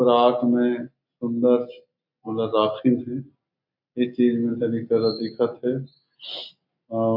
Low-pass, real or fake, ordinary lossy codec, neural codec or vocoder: 5.4 kHz; real; none; none